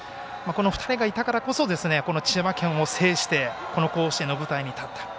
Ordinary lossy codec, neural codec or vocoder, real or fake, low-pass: none; none; real; none